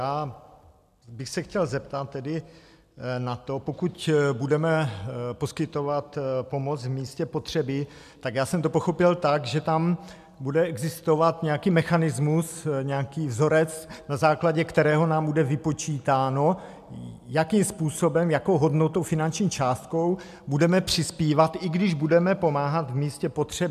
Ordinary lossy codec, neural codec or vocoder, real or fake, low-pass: MP3, 96 kbps; none; real; 14.4 kHz